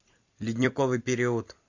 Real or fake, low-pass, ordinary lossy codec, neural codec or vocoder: fake; 7.2 kHz; AAC, 48 kbps; vocoder, 44.1 kHz, 128 mel bands every 256 samples, BigVGAN v2